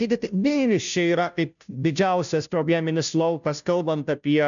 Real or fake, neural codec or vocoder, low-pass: fake; codec, 16 kHz, 0.5 kbps, FunCodec, trained on Chinese and English, 25 frames a second; 7.2 kHz